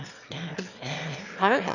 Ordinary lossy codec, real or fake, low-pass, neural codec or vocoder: none; fake; 7.2 kHz; autoencoder, 22.05 kHz, a latent of 192 numbers a frame, VITS, trained on one speaker